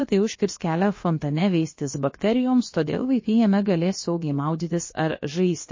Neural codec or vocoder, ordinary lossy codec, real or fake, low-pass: codec, 16 kHz, about 1 kbps, DyCAST, with the encoder's durations; MP3, 32 kbps; fake; 7.2 kHz